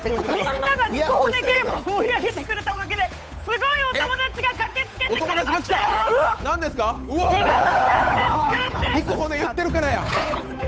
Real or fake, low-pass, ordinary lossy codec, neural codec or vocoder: fake; none; none; codec, 16 kHz, 8 kbps, FunCodec, trained on Chinese and English, 25 frames a second